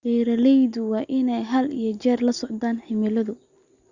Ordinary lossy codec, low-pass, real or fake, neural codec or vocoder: Opus, 64 kbps; 7.2 kHz; real; none